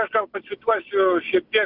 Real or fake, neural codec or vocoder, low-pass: real; none; 5.4 kHz